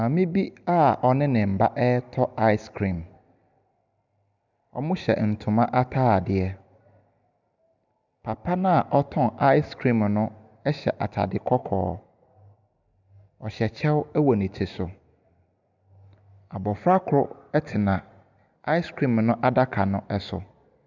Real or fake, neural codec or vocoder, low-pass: real; none; 7.2 kHz